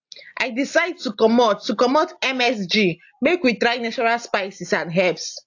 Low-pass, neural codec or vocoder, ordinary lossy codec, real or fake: 7.2 kHz; none; AAC, 48 kbps; real